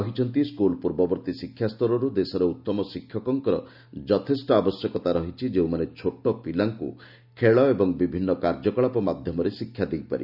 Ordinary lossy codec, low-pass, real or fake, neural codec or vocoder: none; 5.4 kHz; real; none